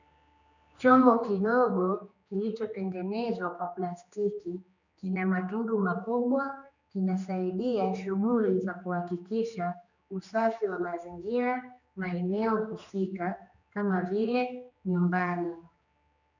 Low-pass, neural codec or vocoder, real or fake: 7.2 kHz; codec, 16 kHz, 2 kbps, X-Codec, HuBERT features, trained on general audio; fake